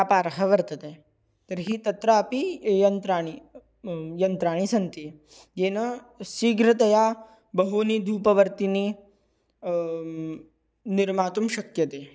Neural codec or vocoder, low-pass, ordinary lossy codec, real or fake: none; none; none; real